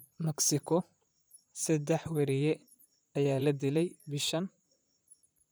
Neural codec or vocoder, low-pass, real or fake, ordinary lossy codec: vocoder, 44.1 kHz, 128 mel bands, Pupu-Vocoder; none; fake; none